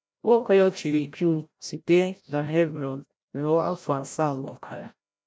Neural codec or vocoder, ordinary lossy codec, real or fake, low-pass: codec, 16 kHz, 0.5 kbps, FreqCodec, larger model; none; fake; none